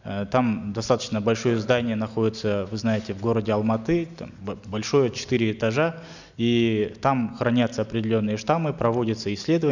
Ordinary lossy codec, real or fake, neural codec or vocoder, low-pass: none; real; none; 7.2 kHz